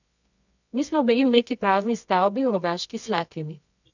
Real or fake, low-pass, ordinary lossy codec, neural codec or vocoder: fake; 7.2 kHz; none; codec, 24 kHz, 0.9 kbps, WavTokenizer, medium music audio release